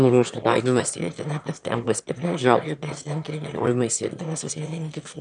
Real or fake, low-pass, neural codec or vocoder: fake; 9.9 kHz; autoencoder, 22.05 kHz, a latent of 192 numbers a frame, VITS, trained on one speaker